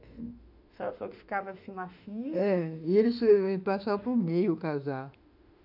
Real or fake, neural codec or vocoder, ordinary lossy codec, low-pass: fake; autoencoder, 48 kHz, 32 numbers a frame, DAC-VAE, trained on Japanese speech; none; 5.4 kHz